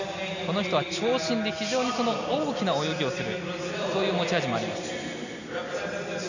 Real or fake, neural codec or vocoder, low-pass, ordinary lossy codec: real; none; 7.2 kHz; none